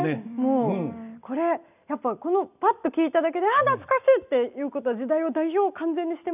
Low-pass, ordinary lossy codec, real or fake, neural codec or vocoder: 3.6 kHz; none; real; none